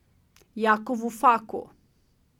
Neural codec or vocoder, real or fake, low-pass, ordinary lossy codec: none; real; 19.8 kHz; none